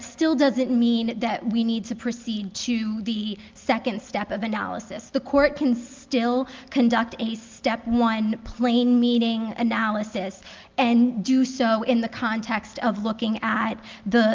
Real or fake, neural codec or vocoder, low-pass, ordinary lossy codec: real; none; 7.2 kHz; Opus, 32 kbps